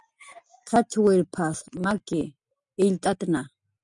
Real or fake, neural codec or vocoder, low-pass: real; none; 10.8 kHz